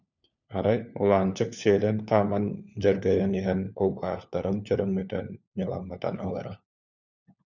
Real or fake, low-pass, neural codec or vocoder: fake; 7.2 kHz; codec, 16 kHz, 4 kbps, FunCodec, trained on LibriTTS, 50 frames a second